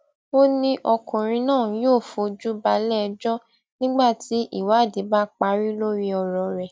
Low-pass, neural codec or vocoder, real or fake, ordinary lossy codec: none; none; real; none